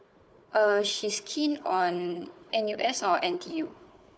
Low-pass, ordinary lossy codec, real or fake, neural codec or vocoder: none; none; fake; codec, 16 kHz, 4 kbps, FunCodec, trained on Chinese and English, 50 frames a second